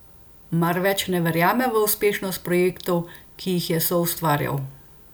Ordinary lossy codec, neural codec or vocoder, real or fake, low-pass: none; none; real; none